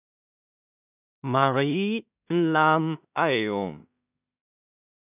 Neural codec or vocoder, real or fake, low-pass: codec, 16 kHz in and 24 kHz out, 0.4 kbps, LongCat-Audio-Codec, two codebook decoder; fake; 3.6 kHz